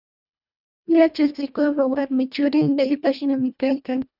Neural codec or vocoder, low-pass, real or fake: codec, 24 kHz, 1.5 kbps, HILCodec; 5.4 kHz; fake